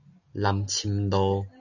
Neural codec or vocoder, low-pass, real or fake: none; 7.2 kHz; real